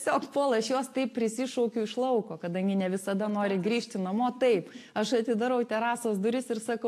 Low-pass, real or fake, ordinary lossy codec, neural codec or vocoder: 14.4 kHz; real; AAC, 64 kbps; none